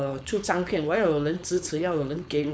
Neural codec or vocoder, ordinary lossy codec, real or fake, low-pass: codec, 16 kHz, 4.8 kbps, FACodec; none; fake; none